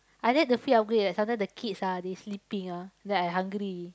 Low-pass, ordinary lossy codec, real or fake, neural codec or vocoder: none; none; real; none